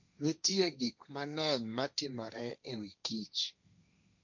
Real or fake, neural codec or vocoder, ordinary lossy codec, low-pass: fake; codec, 16 kHz, 1.1 kbps, Voila-Tokenizer; none; 7.2 kHz